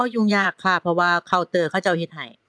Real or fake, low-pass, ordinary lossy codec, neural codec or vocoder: fake; none; none; vocoder, 22.05 kHz, 80 mel bands, Vocos